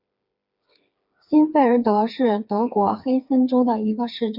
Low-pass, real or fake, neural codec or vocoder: 5.4 kHz; fake; codec, 16 kHz, 4 kbps, FreqCodec, smaller model